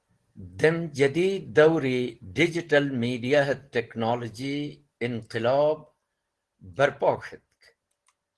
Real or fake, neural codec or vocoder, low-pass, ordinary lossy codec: real; none; 10.8 kHz; Opus, 16 kbps